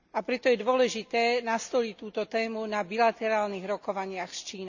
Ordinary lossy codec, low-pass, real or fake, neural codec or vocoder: none; 7.2 kHz; real; none